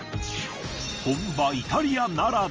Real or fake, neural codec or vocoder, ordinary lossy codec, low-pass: real; none; Opus, 24 kbps; 7.2 kHz